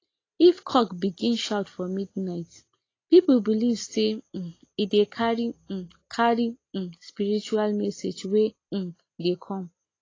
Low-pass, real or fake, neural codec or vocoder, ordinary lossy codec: 7.2 kHz; real; none; AAC, 32 kbps